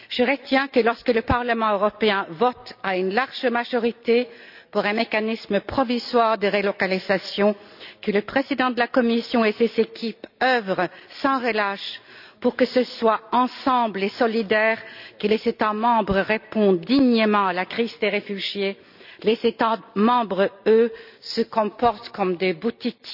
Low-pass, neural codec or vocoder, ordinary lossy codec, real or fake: 5.4 kHz; none; none; real